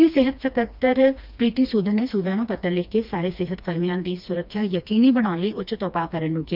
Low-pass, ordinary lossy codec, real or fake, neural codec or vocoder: 5.4 kHz; none; fake; codec, 16 kHz, 2 kbps, FreqCodec, smaller model